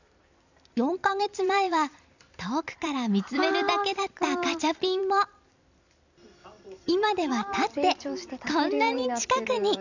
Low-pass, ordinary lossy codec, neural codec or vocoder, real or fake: 7.2 kHz; none; vocoder, 44.1 kHz, 128 mel bands every 256 samples, BigVGAN v2; fake